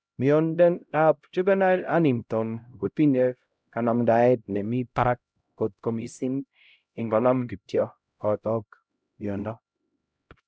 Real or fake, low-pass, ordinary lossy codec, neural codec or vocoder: fake; none; none; codec, 16 kHz, 0.5 kbps, X-Codec, HuBERT features, trained on LibriSpeech